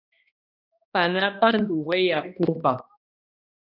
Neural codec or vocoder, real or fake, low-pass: codec, 16 kHz, 1 kbps, X-Codec, HuBERT features, trained on balanced general audio; fake; 5.4 kHz